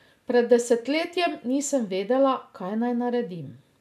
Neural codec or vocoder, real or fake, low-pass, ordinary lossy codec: none; real; 14.4 kHz; none